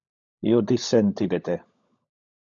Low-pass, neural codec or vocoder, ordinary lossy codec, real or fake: 7.2 kHz; codec, 16 kHz, 16 kbps, FunCodec, trained on LibriTTS, 50 frames a second; MP3, 64 kbps; fake